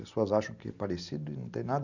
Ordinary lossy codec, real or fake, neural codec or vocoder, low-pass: none; real; none; 7.2 kHz